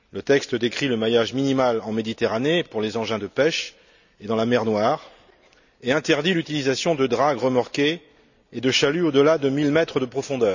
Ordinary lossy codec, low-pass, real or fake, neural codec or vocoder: none; 7.2 kHz; real; none